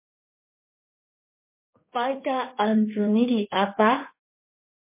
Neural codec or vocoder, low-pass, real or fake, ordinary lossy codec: codec, 16 kHz in and 24 kHz out, 2.2 kbps, FireRedTTS-2 codec; 3.6 kHz; fake; MP3, 24 kbps